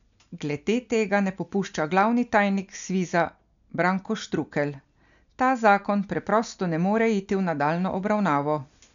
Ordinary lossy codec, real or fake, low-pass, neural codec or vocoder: none; real; 7.2 kHz; none